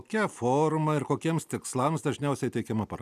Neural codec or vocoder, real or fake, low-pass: none; real; 14.4 kHz